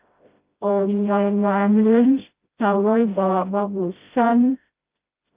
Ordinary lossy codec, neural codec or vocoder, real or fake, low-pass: Opus, 32 kbps; codec, 16 kHz, 0.5 kbps, FreqCodec, smaller model; fake; 3.6 kHz